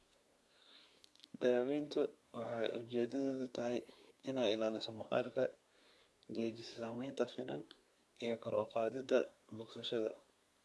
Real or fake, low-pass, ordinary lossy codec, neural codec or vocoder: fake; none; none; codec, 24 kHz, 1 kbps, SNAC